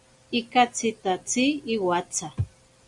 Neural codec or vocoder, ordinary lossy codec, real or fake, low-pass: none; Opus, 64 kbps; real; 10.8 kHz